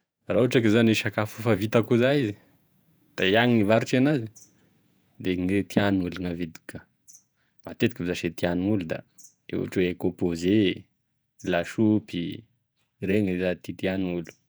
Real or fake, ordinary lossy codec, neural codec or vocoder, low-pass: fake; none; vocoder, 48 kHz, 128 mel bands, Vocos; none